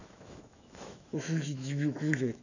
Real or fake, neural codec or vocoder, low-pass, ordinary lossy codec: fake; autoencoder, 48 kHz, 128 numbers a frame, DAC-VAE, trained on Japanese speech; 7.2 kHz; none